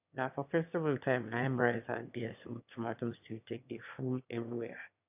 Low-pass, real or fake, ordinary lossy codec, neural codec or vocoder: 3.6 kHz; fake; AAC, 32 kbps; autoencoder, 22.05 kHz, a latent of 192 numbers a frame, VITS, trained on one speaker